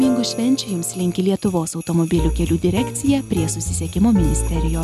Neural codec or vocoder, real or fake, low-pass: none; real; 14.4 kHz